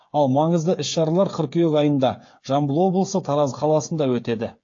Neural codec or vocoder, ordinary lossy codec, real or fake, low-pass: codec, 16 kHz, 8 kbps, FreqCodec, smaller model; AAC, 48 kbps; fake; 7.2 kHz